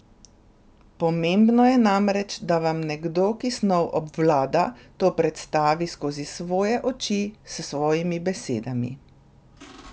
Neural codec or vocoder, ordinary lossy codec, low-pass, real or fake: none; none; none; real